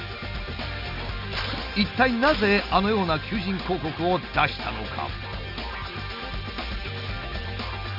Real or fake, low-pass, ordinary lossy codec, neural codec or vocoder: real; 5.4 kHz; none; none